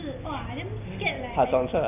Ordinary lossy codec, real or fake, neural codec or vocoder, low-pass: none; real; none; 3.6 kHz